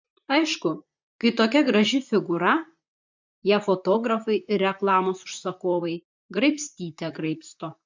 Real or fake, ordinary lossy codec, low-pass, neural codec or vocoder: fake; MP3, 64 kbps; 7.2 kHz; vocoder, 44.1 kHz, 128 mel bands, Pupu-Vocoder